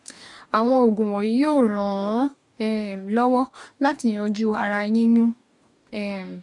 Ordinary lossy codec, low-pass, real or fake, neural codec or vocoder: MP3, 64 kbps; 10.8 kHz; fake; codec, 44.1 kHz, 2.6 kbps, DAC